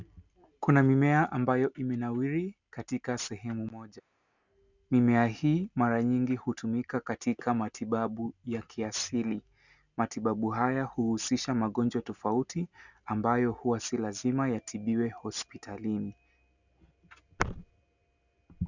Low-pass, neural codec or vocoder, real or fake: 7.2 kHz; none; real